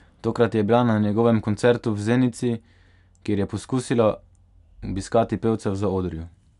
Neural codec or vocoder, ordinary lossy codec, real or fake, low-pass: none; none; real; 10.8 kHz